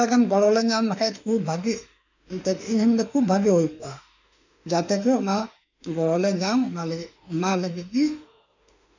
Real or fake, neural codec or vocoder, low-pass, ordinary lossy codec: fake; autoencoder, 48 kHz, 32 numbers a frame, DAC-VAE, trained on Japanese speech; 7.2 kHz; none